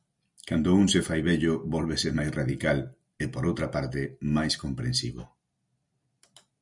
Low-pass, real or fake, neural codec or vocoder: 10.8 kHz; real; none